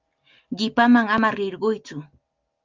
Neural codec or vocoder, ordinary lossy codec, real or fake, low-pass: none; Opus, 24 kbps; real; 7.2 kHz